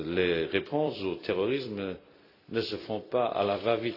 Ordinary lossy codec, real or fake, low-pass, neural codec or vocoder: AAC, 32 kbps; fake; 5.4 kHz; vocoder, 44.1 kHz, 128 mel bands every 256 samples, BigVGAN v2